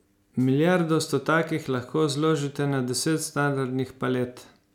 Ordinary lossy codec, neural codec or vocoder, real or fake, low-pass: none; none; real; 19.8 kHz